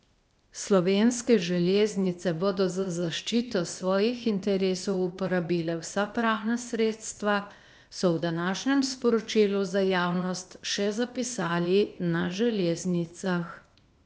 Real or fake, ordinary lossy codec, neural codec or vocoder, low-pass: fake; none; codec, 16 kHz, 0.8 kbps, ZipCodec; none